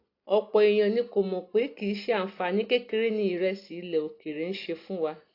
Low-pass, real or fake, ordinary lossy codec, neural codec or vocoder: 5.4 kHz; real; AAC, 32 kbps; none